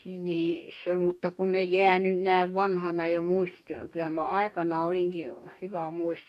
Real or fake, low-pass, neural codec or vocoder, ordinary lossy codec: fake; 14.4 kHz; codec, 44.1 kHz, 2.6 kbps, DAC; none